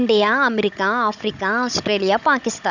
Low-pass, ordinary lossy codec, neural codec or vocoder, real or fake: 7.2 kHz; none; codec, 16 kHz, 16 kbps, FunCodec, trained on Chinese and English, 50 frames a second; fake